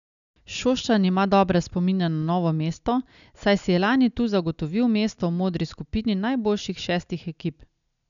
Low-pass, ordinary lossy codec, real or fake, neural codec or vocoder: 7.2 kHz; none; real; none